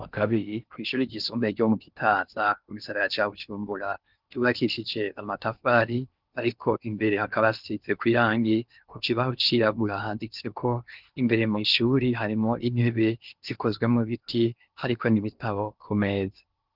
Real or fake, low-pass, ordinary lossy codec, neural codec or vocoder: fake; 5.4 kHz; Opus, 32 kbps; codec, 16 kHz in and 24 kHz out, 0.6 kbps, FocalCodec, streaming, 4096 codes